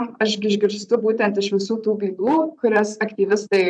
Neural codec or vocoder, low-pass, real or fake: vocoder, 44.1 kHz, 128 mel bands, Pupu-Vocoder; 9.9 kHz; fake